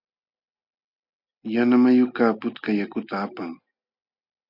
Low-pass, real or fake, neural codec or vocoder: 5.4 kHz; real; none